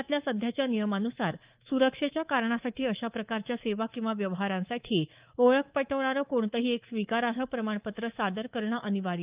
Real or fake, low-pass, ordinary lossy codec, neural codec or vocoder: fake; 3.6 kHz; Opus, 24 kbps; codec, 24 kHz, 3.1 kbps, DualCodec